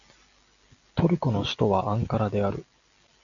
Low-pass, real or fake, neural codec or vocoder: 7.2 kHz; real; none